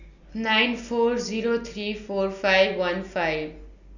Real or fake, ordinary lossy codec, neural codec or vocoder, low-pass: real; none; none; 7.2 kHz